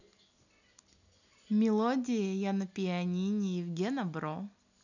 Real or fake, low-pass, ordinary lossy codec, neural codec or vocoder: real; 7.2 kHz; none; none